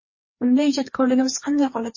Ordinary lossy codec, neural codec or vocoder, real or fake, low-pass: MP3, 32 kbps; codec, 16 kHz, 2 kbps, FreqCodec, larger model; fake; 7.2 kHz